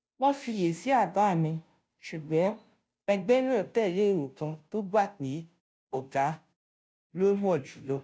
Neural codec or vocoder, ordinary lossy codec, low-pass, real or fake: codec, 16 kHz, 0.5 kbps, FunCodec, trained on Chinese and English, 25 frames a second; none; none; fake